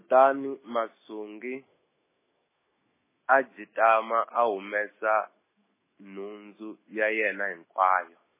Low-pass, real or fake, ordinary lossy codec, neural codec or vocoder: 3.6 kHz; fake; MP3, 16 kbps; autoencoder, 48 kHz, 128 numbers a frame, DAC-VAE, trained on Japanese speech